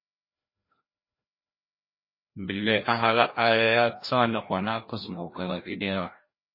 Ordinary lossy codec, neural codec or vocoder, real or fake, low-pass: MP3, 24 kbps; codec, 16 kHz, 1 kbps, FreqCodec, larger model; fake; 5.4 kHz